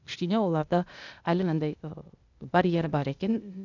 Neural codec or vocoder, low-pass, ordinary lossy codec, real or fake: codec, 16 kHz, 0.8 kbps, ZipCodec; 7.2 kHz; none; fake